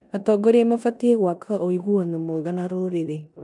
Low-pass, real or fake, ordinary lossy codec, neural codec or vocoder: 10.8 kHz; fake; none; codec, 16 kHz in and 24 kHz out, 0.9 kbps, LongCat-Audio-Codec, four codebook decoder